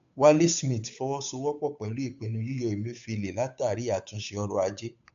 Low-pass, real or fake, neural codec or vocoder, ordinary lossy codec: 7.2 kHz; fake; codec, 16 kHz, 8 kbps, FunCodec, trained on Chinese and English, 25 frames a second; MP3, 64 kbps